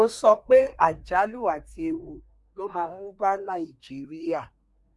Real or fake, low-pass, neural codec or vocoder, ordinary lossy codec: fake; none; codec, 24 kHz, 1 kbps, SNAC; none